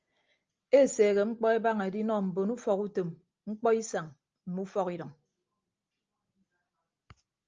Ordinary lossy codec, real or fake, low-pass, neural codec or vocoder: Opus, 24 kbps; real; 7.2 kHz; none